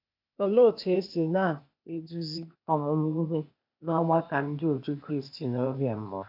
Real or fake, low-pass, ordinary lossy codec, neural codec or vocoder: fake; 5.4 kHz; MP3, 48 kbps; codec, 16 kHz, 0.8 kbps, ZipCodec